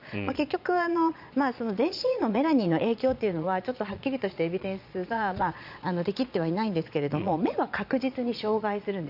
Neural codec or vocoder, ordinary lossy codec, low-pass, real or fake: vocoder, 22.05 kHz, 80 mel bands, Vocos; none; 5.4 kHz; fake